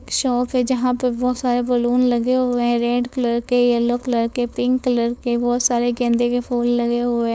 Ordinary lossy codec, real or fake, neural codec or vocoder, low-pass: none; fake; codec, 16 kHz, 4.8 kbps, FACodec; none